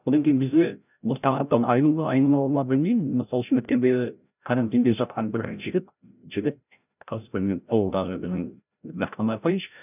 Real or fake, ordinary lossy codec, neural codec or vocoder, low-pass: fake; none; codec, 16 kHz, 0.5 kbps, FreqCodec, larger model; 3.6 kHz